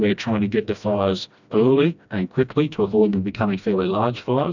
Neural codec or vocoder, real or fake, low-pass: codec, 16 kHz, 1 kbps, FreqCodec, smaller model; fake; 7.2 kHz